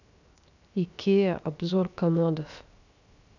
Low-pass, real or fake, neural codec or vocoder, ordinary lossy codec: 7.2 kHz; fake; codec, 16 kHz, 0.7 kbps, FocalCodec; none